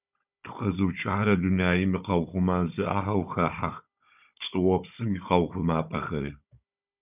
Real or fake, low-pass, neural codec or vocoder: fake; 3.6 kHz; codec, 16 kHz, 16 kbps, FunCodec, trained on Chinese and English, 50 frames a second